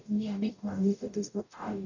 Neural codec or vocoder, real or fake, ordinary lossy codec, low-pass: codec, 44.1 kHz, 0.9 kbps, DAC; fake; none; 7.2 kHz